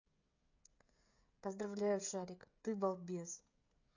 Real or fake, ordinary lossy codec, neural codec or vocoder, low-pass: fake; AAC, 48 kbps; codec, 16 kHz, 16 kbps, FreqCodec, smaller model; 7.2 kHz